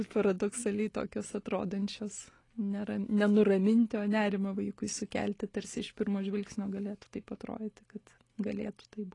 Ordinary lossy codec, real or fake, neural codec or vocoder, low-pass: AAC, 32 kbps; real; none; 10.8 kHz